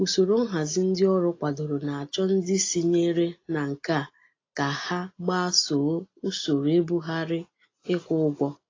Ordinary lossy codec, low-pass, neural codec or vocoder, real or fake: AAC, 32 kbps; 7.2 kHz; none; real